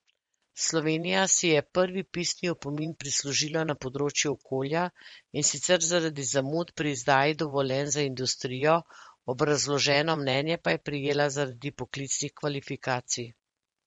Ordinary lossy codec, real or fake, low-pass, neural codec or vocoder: MP3, 48 kbps; fake; 10.8 kHz; vocoder, 24 kHz, 100 mel bands, Vocos